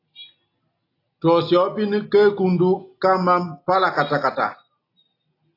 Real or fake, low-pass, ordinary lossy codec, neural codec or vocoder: real; 5.4 kHz; AAC, 32 kbps; none